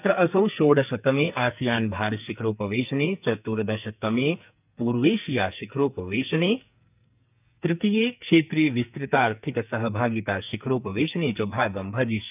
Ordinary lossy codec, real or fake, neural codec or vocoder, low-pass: none; fake; codec, 44.1 kHz, 2.6 kbps, SNAC; 3.6 kHz